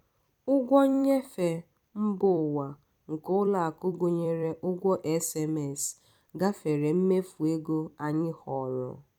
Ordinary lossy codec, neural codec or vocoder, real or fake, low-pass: none; vocoder, 44.1 kHz, 128 mel bands every 256 samples, BigVGAN v2; fake; 19.8 kHz